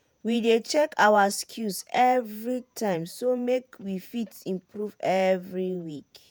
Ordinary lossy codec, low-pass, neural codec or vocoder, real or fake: none; none; vocoder, 48 kHz, 128 mel bands, Vocos; fake